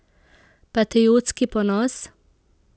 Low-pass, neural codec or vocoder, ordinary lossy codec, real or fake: none; none; none; real